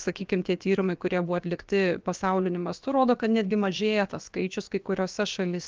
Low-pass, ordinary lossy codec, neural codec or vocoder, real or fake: 7.2 kHz; Opus, 24 kbps; codec, 16 kHz, about 1 kbps, DyCAST, with the encoder's durations; fake